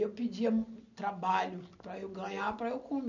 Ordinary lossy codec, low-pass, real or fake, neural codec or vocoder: none; 7.2 kHz; real; none